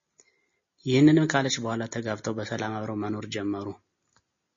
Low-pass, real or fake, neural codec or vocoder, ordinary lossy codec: 7.2 kHz; real; none; MP3, 32 kbps